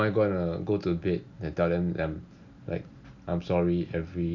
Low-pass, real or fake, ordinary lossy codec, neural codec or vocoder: 7.2 kHz; real; none; none